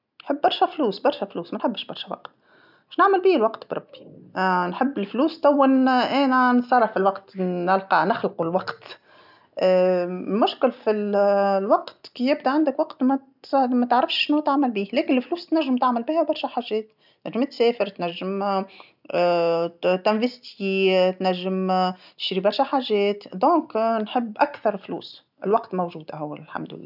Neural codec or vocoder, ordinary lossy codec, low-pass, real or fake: none; none; 5.4 kHz; real